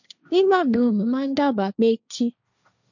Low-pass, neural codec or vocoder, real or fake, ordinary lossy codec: 7.2 kHz; codec, 16 kHz, 1.1 kbps, Voila-Tokenizer; fake; none